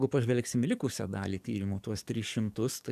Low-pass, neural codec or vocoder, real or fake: 14.4 kHz; codec, 44.1 kHz, 7.8 kbps, DAC; fake